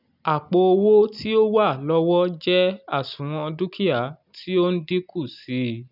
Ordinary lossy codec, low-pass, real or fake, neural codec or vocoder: none; 5.4 kHz; real; none